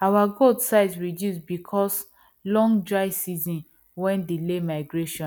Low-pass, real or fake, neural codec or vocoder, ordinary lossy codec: 19.8 kHz; real; none; none